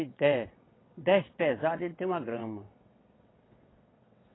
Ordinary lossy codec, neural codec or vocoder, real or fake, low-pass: AAC, 16 kbps; vocoder, 22.05 kHz, 80 mel bands, WaveNeXt; fake; 7.2 kHz